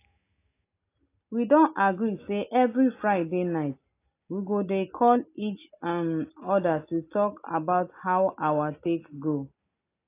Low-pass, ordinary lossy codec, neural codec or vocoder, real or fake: 3.6 kHz; AAC, 24 kbps; none; real